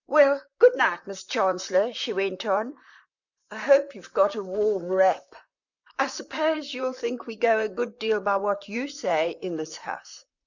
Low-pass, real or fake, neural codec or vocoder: 7.2 kHz; fake; vocoder, 44.1 kHz, 128 mel bands, Pupu-Vocoder